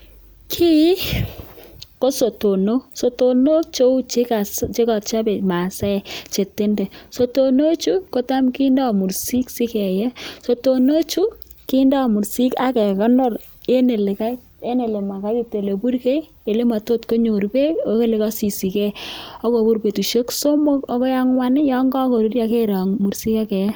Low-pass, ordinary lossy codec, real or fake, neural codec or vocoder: none; none; real; none